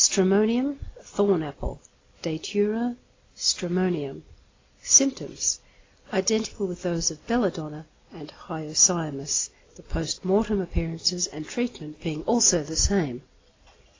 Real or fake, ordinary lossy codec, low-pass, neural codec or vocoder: real; AAC, 32 kbps; 7.2 kHz; none